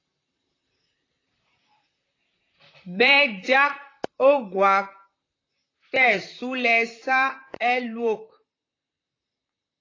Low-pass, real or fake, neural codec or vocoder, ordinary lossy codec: 7.2 kHz; fake; vocoder, 44.1 kHz, 128 mel bands, Pupu-Vocoder; AAC, 32 kbps